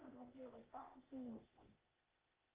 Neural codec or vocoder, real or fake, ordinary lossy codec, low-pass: codec, 16 kHz, 0.8 kbps, ZipCodec; fake; Opus, 32 kbps; 3.6 kHz